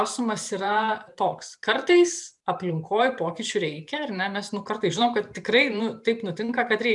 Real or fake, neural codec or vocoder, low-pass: fake; vocoder, 44.1 kHz, 128 mel bands every 512 samples, BigVGAN v2; 10.8 kHz